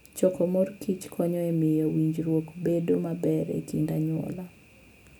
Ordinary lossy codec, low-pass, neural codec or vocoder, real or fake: none; none; none; real